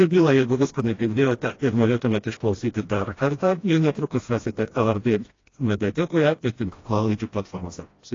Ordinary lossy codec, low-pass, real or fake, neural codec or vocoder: AAC, 32 kbps; 7.2 kHz; fake; codec, 16 kHz, 1 kbps, FreqCodec, smaller model